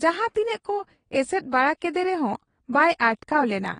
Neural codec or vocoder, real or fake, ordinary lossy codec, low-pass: vocoder, 22.05 kHz, 80 mel bands, WaveNeXt; fake; AAC, 32 kbps; 9.9 kHz